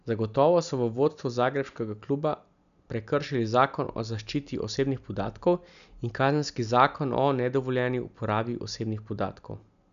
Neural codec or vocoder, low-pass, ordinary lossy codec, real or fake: none; 7.2 kHz; none; real